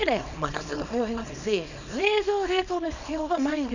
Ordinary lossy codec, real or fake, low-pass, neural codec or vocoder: none; fake; 7.2 kHz; codec, 24 kHz, 0.9 kbps, WavTokenizer, small release